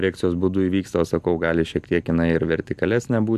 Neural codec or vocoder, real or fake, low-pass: none; real; 14.4 kHz